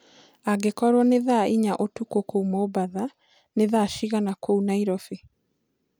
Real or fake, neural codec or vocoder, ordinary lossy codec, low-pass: real; none; none; none